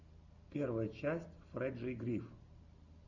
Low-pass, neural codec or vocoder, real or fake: 7.2 kHz; none; real